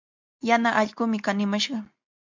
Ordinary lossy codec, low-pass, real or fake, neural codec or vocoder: MP3, 64 kbps; 7.2 kHz; real; none